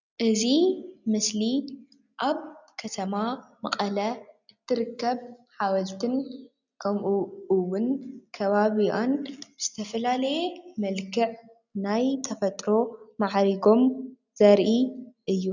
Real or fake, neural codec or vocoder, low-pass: real; none; 7.2 kHz